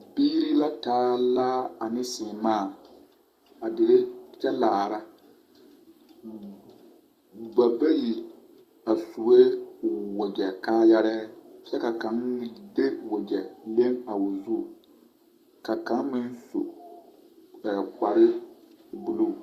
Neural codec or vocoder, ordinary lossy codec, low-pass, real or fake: codec, 44.1 kHz, 7.8 kbps, Pupu-Codec; Opus, 64 kbps; 14.4 kHz; fake